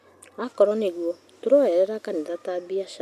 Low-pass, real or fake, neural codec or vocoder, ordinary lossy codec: 14.4 kHz; real; none; none